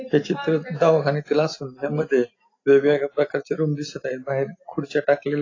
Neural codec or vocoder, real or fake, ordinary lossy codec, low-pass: none; real; AAC, 32 kbps; 7.2 kHz